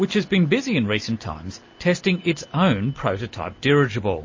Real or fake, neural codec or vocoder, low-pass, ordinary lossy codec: real; none; 7.2 kHz; MP3, 32 kbps